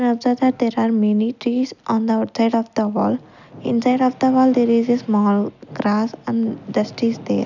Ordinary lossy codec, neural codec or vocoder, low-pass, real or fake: none; none; 7.2 kHz; real